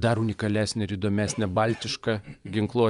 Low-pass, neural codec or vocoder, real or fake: 10.8 kHz; vocoder, 24 kHz, 100 mel bands, Vocos; fake